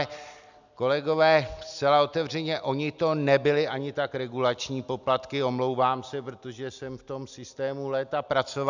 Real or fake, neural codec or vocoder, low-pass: real; none; 7.2 kHz